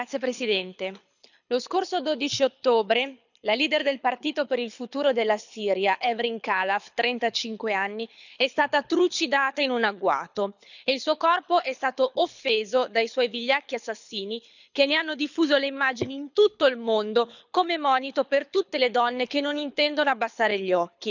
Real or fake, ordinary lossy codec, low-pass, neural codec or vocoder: fake; none; 7.2 kHz; codec, 24 kHz, 6 kbps, HILCodec